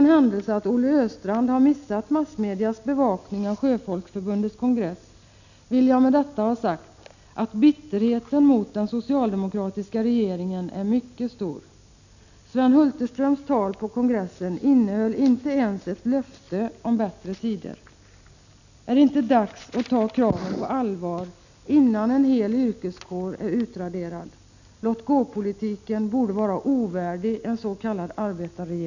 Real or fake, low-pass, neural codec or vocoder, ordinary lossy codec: real; 7.2 kHz; none; none